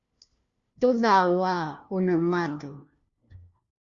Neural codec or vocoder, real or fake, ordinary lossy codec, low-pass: codec, 16 kHz, 1 kbps, FunCodec, trained on LibriTTS, 50 frames a second; fake; Opus, 64 kbps; 7.2 kHz